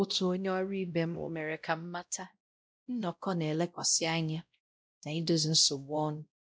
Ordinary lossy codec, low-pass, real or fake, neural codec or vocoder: none; none; fake; codec, 16 kHz, 0.5 kbps, X-Codec, WavLM features, trained on Multilingual LibriSpeech